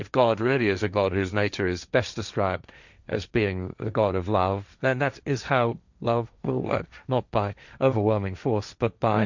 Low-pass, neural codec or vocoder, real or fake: 7.2 kHz; codec, 16 kHz, 1.1 kbps, Voila-Tokenizer; fake